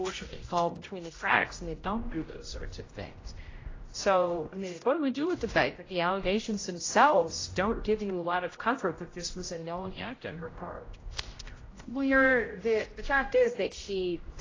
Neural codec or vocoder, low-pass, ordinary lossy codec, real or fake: codec, 16 kHz, 0.5 kbps, X-Codec, HuBERT features, trained on general audio; 7.2 kHz; AAC, 32 kbps; fake